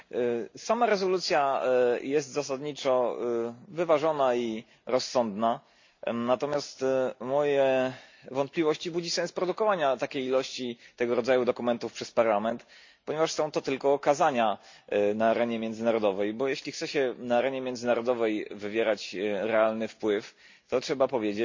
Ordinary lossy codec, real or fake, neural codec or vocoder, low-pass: MP3, 48 kbps; real; none; 7.2 kHz